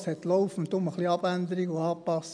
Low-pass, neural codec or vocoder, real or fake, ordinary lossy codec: 9.9 kHz; none; real; none